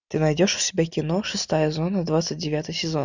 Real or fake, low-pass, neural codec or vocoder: real; 7.2 kHz; none